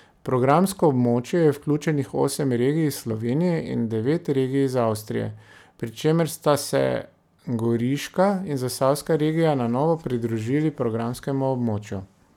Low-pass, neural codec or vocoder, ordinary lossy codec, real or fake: 19.8 kHz; none; none; real